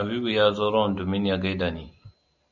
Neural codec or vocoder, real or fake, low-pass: none; real; 7.2 kHz